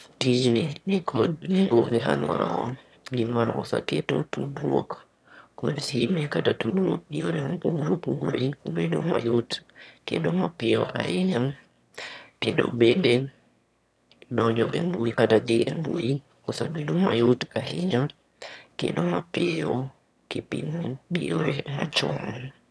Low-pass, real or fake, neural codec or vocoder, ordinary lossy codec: none; fake; autoencoder, 22.05 kHz, a latent of 192 numbers a frame, VITS, trained on one speaker; none